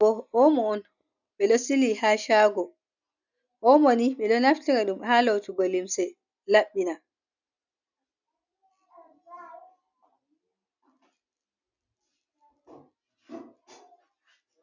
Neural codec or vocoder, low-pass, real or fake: none; 7.2 kHz; real